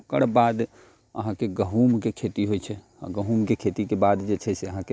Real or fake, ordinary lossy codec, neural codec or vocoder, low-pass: real; none; none; none